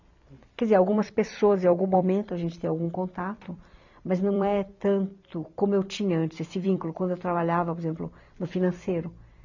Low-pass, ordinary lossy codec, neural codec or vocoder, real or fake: 7.2 kHz; none; vocoder, 44.1 kHz, 128 mel bands every 256 samples, BigVGAN v2; fake